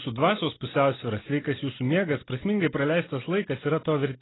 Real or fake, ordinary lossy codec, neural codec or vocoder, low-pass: real; AAC, 16 kbps; none; 7.2 kHz